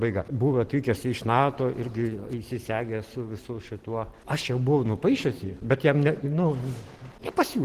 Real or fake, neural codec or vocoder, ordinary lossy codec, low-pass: real; none; Opus, 16 kbps; 14.4 kHz